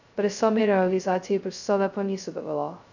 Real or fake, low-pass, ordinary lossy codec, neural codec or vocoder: fake; 7.2 kHz; none; codec, 16 kHz, 0.2 kbps, FocalCodec